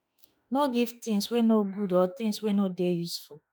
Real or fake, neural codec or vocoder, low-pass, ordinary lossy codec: fake; autoencoder, 48 kHz, 32 numbers a frame, DAC-VAE, trained on Japanese speech; none; none